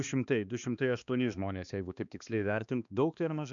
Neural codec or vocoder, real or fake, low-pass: codec, 16 kHz, 2 kbps, X-Codec, HuBERT features, trained on balanced general audio; fake; 7.2 kHz